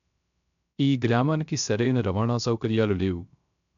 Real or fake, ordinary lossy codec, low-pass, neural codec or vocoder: fake; none; 7.2 kHz; codec, 16 kHz, 0.3 kbps, FocalCodec